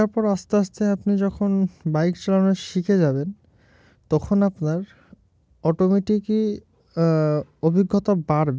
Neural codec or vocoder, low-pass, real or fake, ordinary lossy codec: none; none; real; none